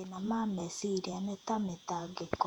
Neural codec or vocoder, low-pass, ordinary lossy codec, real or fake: vocoder, 48 kHz, 128 mel bands, Vocos; 19.8 kHz; none; fake